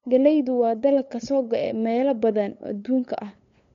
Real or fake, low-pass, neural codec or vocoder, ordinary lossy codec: fake; 7.2 kHz; codec, 16 kHz, 8 kbps, FunCodec, trained on LibriTTS, 25 frames a second; MP3, 48 kbps